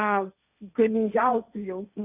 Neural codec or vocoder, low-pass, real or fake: codec, 16 kHz, 1.1 kbps, Voila-Tokenizer; 3.6 kHz; fake